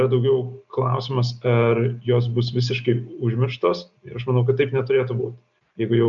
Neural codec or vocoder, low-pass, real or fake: none; 7.2 kHz; real